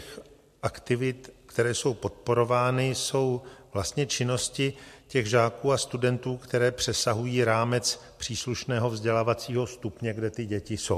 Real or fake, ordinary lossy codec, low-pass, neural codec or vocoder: real; MP3, 64 kbps; 14.4 kHz; none